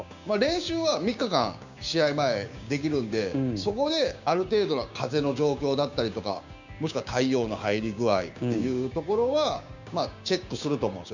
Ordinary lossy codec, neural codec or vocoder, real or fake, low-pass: none; codec, 16 kHz, 6 kbps, DAC; fake; 7.2 kHz